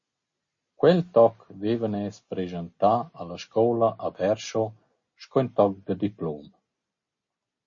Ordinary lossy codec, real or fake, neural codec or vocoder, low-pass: MP3, 32 kbps; real; none; 7.2 kHz